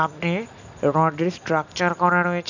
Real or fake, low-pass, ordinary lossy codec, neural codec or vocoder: real; 7.2 kHz; none; none